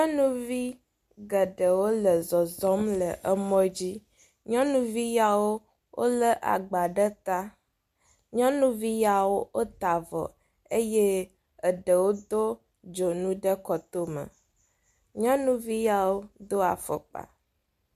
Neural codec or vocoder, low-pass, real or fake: none; 14.4 kHz; real